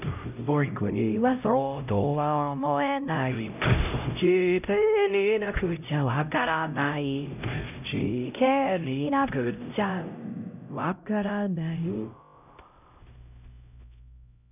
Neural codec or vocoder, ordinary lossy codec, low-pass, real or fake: codec, 16 kHz, 0.5 kbps, X-Codec, HuBERT features, trained on LibriSpeech; none; 3.6 kHz; fake